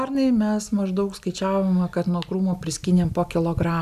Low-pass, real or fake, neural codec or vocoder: 14.4 kHz; real; none